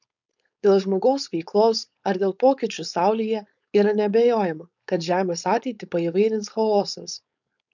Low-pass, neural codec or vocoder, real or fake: 7.2 kHz; codec, 16 kHz, 4.8 kbps, FACodec; fake